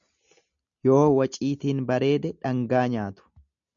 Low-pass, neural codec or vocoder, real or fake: 7.2 kHz; none; real